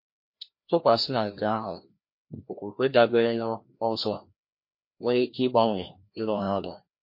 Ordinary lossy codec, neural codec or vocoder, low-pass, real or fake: MP3, 32 kbps; codec, 16 kHz, 1 kbps, FreqCodec, larger model; 5.4 kHz; fake